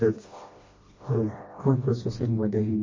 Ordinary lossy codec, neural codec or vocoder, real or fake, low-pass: MP3, 32 kbps; codec, 16 kHz, 1 kbps, FreqCodec, smaller model; fake; 7.2 kHz